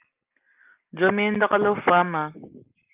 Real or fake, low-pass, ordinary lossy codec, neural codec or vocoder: real; 3.6 kHz; Opus, 32 kbps; none